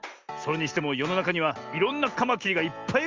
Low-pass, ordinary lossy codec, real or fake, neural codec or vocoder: 7.2 kHz; Opus, 32 kbps; real; none